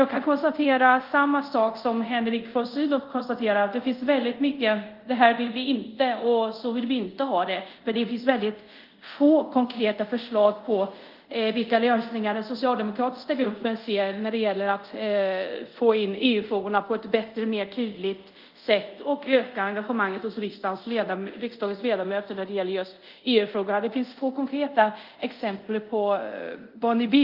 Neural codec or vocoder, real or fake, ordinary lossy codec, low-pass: codec, 24 kHz, 0.5 kbps, DualCodec; fake; Opus, 32 kbps; 5.4 kHz